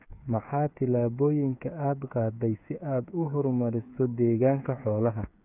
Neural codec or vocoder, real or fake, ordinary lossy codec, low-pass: codec, 16 kHz, 8 kbps, FreqCodec, smaller model; fake; none; 3.6 kHz